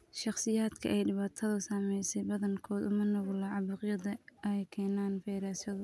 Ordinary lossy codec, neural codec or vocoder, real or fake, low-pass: none; none; real; none